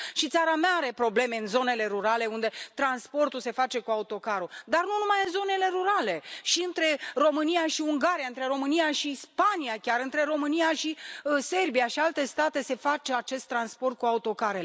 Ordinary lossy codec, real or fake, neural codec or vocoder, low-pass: none; real; none; none